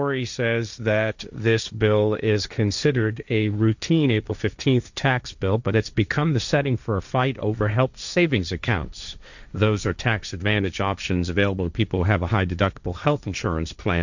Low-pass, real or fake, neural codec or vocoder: 7.2 kHz; fake; codec, 16 kHz, 1.1 kbps, Voila-Tokenizer